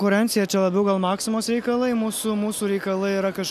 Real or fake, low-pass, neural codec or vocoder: real; 14.4 kHz; none